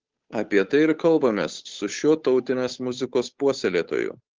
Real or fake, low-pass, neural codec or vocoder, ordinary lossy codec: fake; 7.2 kHz; codec, 16 kHz, 8 kbps, FunCodec, trained on Chinese and English, 25 frames a second; Opus, 16 kbps